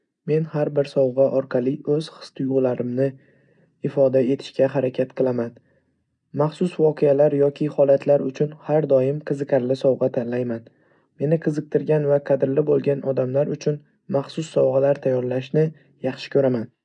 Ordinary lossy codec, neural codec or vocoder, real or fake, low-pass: MP3, 96 kbps; none; real; 9.9 kHz